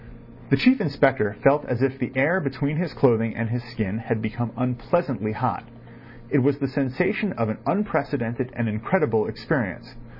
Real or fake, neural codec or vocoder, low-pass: real; none; 5.4 kHz